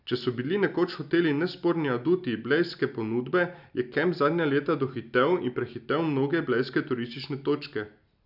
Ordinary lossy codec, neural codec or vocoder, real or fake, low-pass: none; none; real; 5.4 kHz